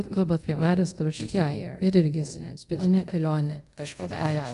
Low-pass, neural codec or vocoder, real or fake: 10.8 kHz; codec, 24 kHz, 0.5 kbps, DualCodec; fake